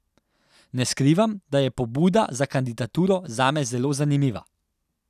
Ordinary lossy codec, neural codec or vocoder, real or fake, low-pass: none; none; real; 14.4 kHz